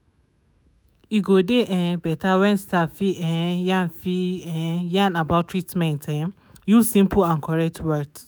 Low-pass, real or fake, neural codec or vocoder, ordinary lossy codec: none; fake; autoencoder, 48 kHz, 128 numbers a frame, DAC-VAE, trained on Japanese speech; none